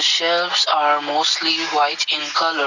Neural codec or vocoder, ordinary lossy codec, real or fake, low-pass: none; none; real; 7.2 kHz